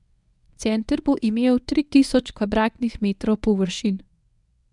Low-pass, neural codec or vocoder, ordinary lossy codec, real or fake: 10.8 kHz; codec, 24 kHz, 0.9 kbps, WavTokenizer, medium speech release version 1; none; fake